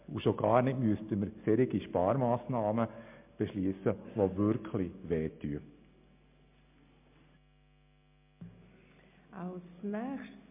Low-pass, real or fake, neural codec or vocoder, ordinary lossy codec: 3.6 kHz; real; none; MP3, 32 kbps